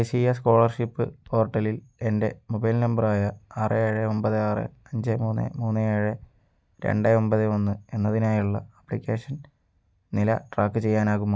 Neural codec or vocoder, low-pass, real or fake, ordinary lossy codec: none; none; real; none